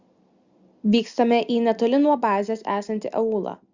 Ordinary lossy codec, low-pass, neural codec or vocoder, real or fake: Opus, 64 kbps; 7.2 kHz; none; real